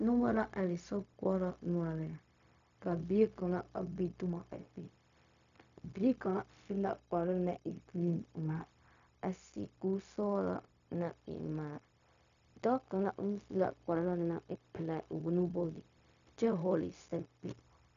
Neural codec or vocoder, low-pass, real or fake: codec, 16 kHz, 0.4 kbps, LongCat-Audio-Codec; 7.2 kHz; fake